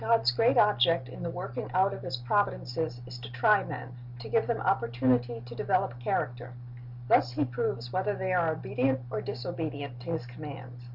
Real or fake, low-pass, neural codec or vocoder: real; 5.4 kHz; none